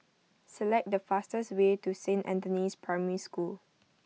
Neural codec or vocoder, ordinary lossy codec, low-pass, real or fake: none; none; none; real